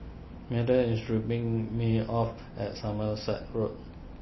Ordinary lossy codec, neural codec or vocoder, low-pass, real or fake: MP3, 24 kbps; none; 7.2 kHz; real